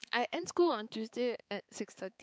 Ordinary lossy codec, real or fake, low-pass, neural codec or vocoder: none; fake; none; codec, 16 kHz, 4 kbps, X-Codec, HuBERT features, trained on balanced general audio